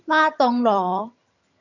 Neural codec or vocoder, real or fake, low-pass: vocoder, 22.05 kHz, 80 mel bands, HiFi-GAN; fake; 7.2 kHz